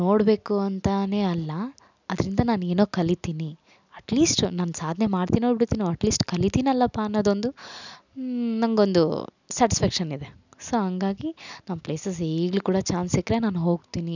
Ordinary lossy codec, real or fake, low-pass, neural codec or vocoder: none; real; 7.2 kHz; none